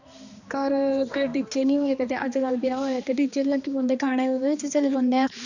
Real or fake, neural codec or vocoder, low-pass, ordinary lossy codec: fake; codec, 16 kHz, 2 kbps, X-Codec, HuBERT features, trained on balanced general audio; 7.2 kHz; none